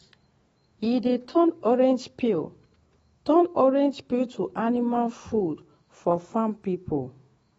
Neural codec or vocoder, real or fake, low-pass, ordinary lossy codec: autoencoder, 48 kHz, 128 numbers a frame, DAC-VAE, trained on Japanese speech; fake; 19.8 kHz; AAC, 24 kbps